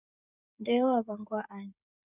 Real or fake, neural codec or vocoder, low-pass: fake; codec, 16 kHz, 16 kbps, FreqCodec, smaller model; 3.6 kHz